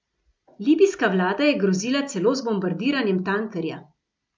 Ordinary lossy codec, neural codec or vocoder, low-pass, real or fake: none; none; none; real